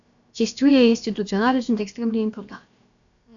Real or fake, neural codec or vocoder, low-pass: fake; codec, 16 kHz, about 1 kbps, DyCAST, with the encoder's durations; 7.2 kHz